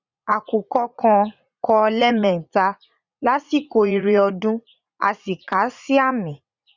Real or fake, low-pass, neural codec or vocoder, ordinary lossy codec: fake; 7.2 kHz; vocoder, 44.1 kHz, 80 mel bands, Vocos; Opus, 64 kbps